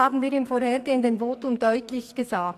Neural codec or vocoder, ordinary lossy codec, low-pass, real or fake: codec, 44.1 kHz, 2.6 kbps, SNAC; AAC, 64 kbps; 14.4 kHz; fake